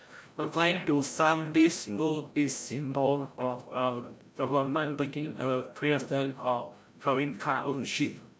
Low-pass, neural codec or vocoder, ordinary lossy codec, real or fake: none; codec, 16 kHz, 0.5 kbps, FreqCodec, larger model; none; fake